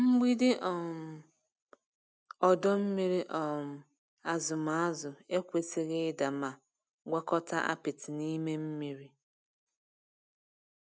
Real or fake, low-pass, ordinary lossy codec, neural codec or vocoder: real; none; none; none